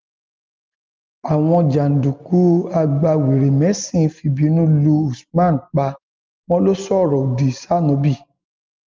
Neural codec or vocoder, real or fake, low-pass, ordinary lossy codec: none; real; 7.2 kHz; Opus, 32 kbps